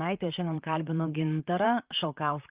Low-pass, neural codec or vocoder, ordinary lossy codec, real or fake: 3.6 kHz; vocoder, 44.1 kHz, 128 mel bands, Pupu-Vocoder; Opus, 32 kbps; fake